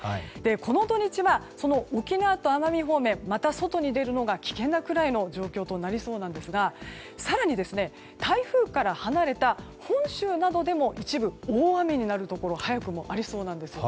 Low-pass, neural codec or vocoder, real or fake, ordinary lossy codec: none; none; real; none